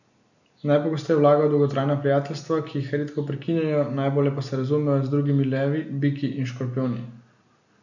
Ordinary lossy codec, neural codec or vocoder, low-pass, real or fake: none; none; 7.2 kHz; real